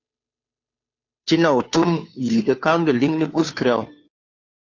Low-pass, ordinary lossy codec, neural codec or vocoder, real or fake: 7.2 kHz; AAC, 48 kbps; codec, 16 kHz, 2 kbps, FunCodec, trained on Chinese and English, 25 frames a second; fake